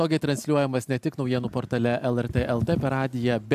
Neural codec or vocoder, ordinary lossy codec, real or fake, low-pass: none; AAC, 96 kbps; real; 14.4 kHz